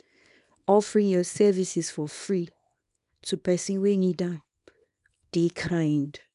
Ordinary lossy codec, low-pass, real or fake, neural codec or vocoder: none; 10.8 kHz; fake; codec, 24 kHz, 0.9 kbps, WavTokenizer, small release